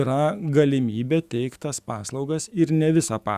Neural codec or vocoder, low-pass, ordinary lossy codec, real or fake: autoencoder, 48 kHz, 128 numbers a frame, DAC-VAE, trained on Japanese speech; 14.4 kHz; Opus, 64 kbps; fake